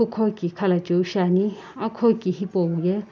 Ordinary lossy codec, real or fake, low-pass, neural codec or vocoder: none; real; none; none